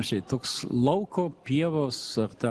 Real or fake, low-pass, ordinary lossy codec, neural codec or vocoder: real; 10.8 kHz; Opus, 16 kbps; none